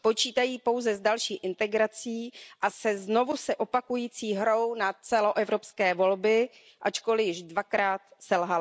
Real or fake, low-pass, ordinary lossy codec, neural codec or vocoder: real; none; none; none